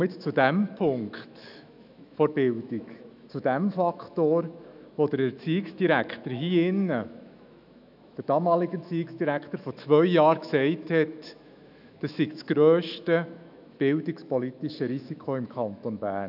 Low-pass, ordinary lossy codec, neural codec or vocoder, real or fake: 5.4 kHz; none; autoencoder, 48 kHz, 128 numbers a frame, DAC-VAE, trained on Japanese speech; fake